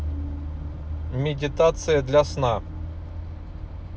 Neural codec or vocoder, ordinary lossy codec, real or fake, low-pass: none; none; real; none